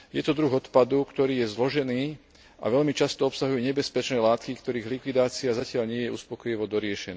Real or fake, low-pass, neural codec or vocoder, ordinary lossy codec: real; none; none; none